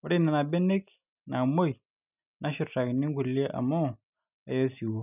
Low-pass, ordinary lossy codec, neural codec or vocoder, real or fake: 3.6 kHz; none; none; real